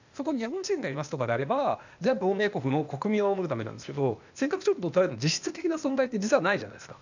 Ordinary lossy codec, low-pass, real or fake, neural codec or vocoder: none; 7.2 kHz; fake; codec, 16 kHz, 0.8 kbps, ZipCodec